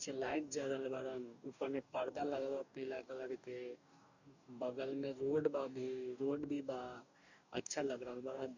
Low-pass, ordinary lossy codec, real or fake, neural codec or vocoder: 7.2 kHz; none; fake; codec, 44.1 kHz, 2.6 kbps, DAC